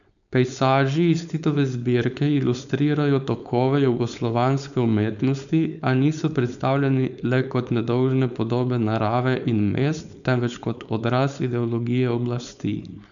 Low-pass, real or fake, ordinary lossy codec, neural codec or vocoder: 7.2 kHz; fake; none; codec, 16 kHz, 4.8 kbps, FACodec